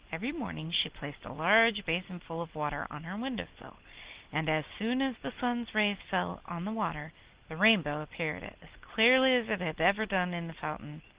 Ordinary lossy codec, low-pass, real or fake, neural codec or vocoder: Opus, 16 kbps; 3.6 kHz; real; none